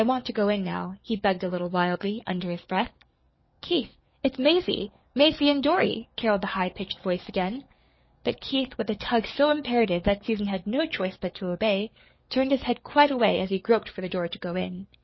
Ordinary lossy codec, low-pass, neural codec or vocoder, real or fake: MP3, 24 kbps; 7.2 kHz; codec, 44.1 kHz, 7.8 kbps, DAC; fake